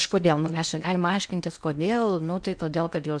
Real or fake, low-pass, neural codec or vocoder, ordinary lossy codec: fake; 9.9 kHz; codec, 16 kHz in and 24 kHz out, 0.8 kbps, FocalCodec, streaming, 65536 codes; MP3, 96 kbps